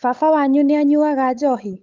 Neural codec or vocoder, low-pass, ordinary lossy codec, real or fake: codec, 16 kHz, 8 kbps, FunCodec, trained on Chinese and English, 25 frames a second; 7.2 kHz; Opus, 24 kbps; fake